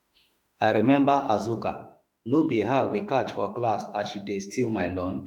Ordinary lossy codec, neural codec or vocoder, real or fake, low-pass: none; autoencoder, 48 kHz, 32 numbers a frame, DAC-VAE, trained on Japanese speech; fake; 19.8 kHz